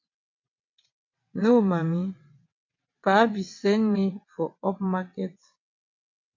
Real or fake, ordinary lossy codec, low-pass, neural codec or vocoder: fake; MP3, 48 kbps; 7.2 kHz; vocoder, 22.05 kHz, 80 mel bands, WaveNeXt